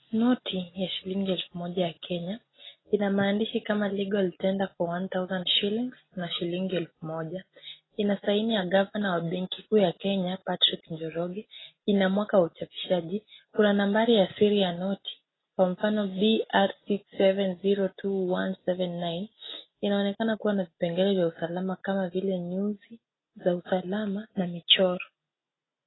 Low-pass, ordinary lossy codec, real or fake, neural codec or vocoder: 7.2 kHz; AAC, 16 kbps; real; none